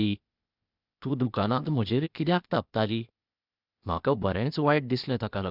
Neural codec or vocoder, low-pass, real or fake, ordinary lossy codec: codec, 16 kHz, 0.8 kbps, ZipCodec; 5.4 kHz; fake; none